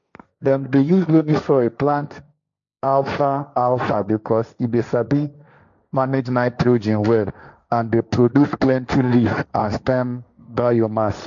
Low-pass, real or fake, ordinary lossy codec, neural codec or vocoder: 7.2 kHz; fake; none; codec, 16 kHz, 1.1 kbps, Voila-Tokenizer